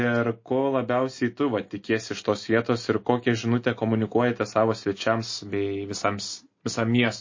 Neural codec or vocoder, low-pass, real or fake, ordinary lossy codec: none; 7.2 kHz; real; MP3, 32 kbps